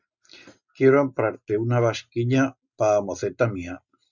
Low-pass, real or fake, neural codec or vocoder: 7.2 kHz; real; none